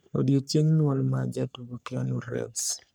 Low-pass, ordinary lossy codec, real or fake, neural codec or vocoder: none; none; fake; codec, 44.1 kHz, 3.4 kbps, Pupu-Codec